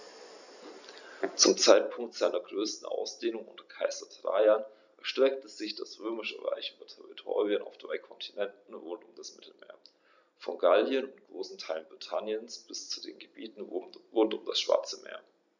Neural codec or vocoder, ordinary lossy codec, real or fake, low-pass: none; none; real; 7.2 kHz